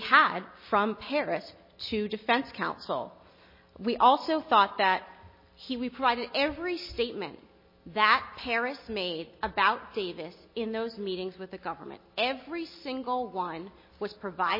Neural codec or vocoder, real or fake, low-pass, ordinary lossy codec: none; real; 5.4 kHz; MP3, 24 kbps